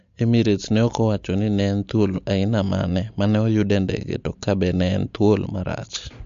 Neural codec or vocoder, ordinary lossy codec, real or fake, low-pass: none; MP3, 48 kbps; real; 7.2 kHz